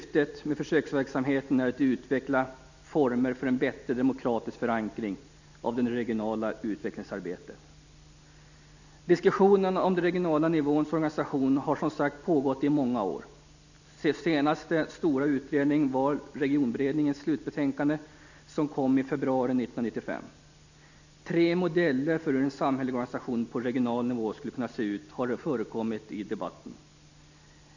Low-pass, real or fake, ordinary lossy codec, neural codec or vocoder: 7.2 kHz; real; none; none